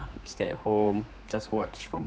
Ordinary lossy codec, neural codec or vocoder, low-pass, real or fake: none; codec, 16 kHz, 2 kbps, X-Codec, HuBERT features, trained on general audio; none; fake